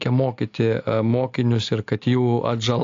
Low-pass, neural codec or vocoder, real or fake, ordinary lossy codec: 7.2 kHz; none; real; AAC, 48 kbps